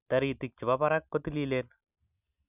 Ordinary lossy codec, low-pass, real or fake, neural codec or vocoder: none; 3.6 kHz; real; none